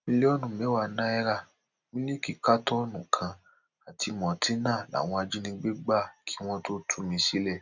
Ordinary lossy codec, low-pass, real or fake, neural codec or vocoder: none; 7.2 kHz; real; none